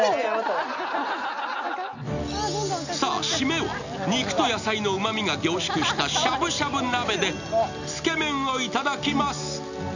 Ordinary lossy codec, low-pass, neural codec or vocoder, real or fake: none; 7.2 kHz; none; real